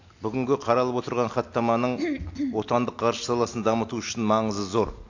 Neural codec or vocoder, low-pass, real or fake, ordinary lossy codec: none; 7.2 kHz; real; AAC, 48 kbps